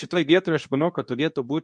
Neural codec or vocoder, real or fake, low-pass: codec, 24 kHz, 0.9 kbps, WavTokenizer, medium speech release version 2; fake; 9.9 kHz